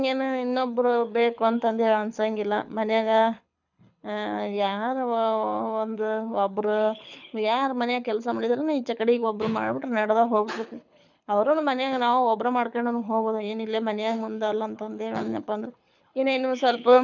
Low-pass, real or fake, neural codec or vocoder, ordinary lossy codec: 7.2 kHz; fake; codec, 24 kHz, 6 kbps, HILCodec; none